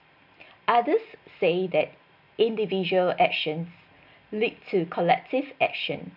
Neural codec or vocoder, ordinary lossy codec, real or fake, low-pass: none; none; real; 5.4 kHz